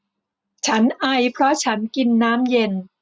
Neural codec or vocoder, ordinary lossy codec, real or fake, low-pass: none; none; real; none